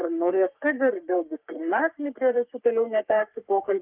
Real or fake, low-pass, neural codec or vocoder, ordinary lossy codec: fake; 3.6 kHz; codec, 44.1 kHz, 3.4 kbps, Pupu-Codec; Opus, 32 kbps